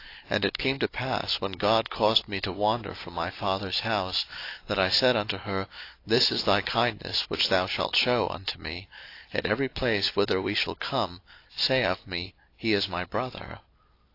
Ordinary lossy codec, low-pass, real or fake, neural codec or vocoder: AAC, 32 kbps; 5.4 kHz; real; none